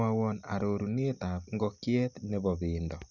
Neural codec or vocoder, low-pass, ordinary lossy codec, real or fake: none; 7.2 kHz; Opus, 64 kbps; real